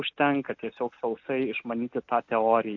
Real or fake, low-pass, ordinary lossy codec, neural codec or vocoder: real; 7.2 kHz; AAC, 48 kbps; none